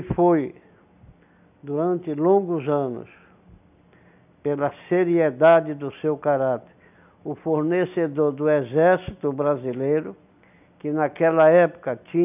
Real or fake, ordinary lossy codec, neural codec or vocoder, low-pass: real; none; none; 3.6 kHz